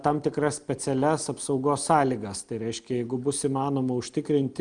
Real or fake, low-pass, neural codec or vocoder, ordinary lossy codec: real; 9.9 kHz; none; Opus, 32 kbps